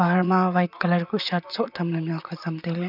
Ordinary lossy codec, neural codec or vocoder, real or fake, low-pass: none; vocoder, 44.1 kHz, 128 mel bands, Pupu-Vocoder; fake; 5.4 kHz